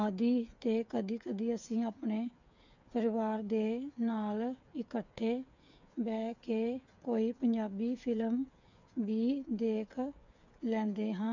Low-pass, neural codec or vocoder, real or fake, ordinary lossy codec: 7.2 kHz; codec, 16 kHz, 8 kbps, FreqCodec, smaller model; fake; none